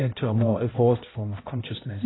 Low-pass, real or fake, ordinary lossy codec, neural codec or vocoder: 7.2 kHz; fake; AAC, 16 kbps; codec, 16 kHz, 0.5 kbps, X-Codec, HuBERT features, trained on balanced general audio